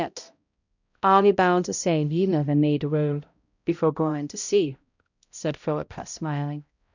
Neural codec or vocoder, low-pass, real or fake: codec, 16 kHz, 0.5 kbps, X-Codec, HuBERT features, trained on balanced general audio; 7.2 kHz; fake